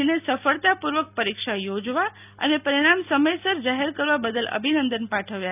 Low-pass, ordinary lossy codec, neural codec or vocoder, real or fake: 3.6 kHz; none; none; real